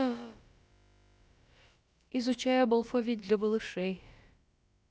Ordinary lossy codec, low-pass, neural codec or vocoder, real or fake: none; none; codec, 16 kHz, about 1 kbps, DyCAST, with the encoder's durations; fake